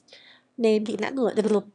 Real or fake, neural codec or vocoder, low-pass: fake; autoencoder, 22.05 kHz, a latent of 192 numbers a frame, VITS, trained on one speaker; 9.9 kHz